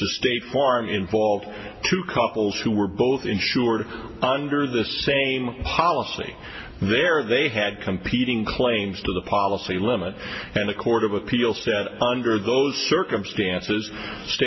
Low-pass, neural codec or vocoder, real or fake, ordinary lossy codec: 7.2 kHz; none; real; MP3, 24 kbps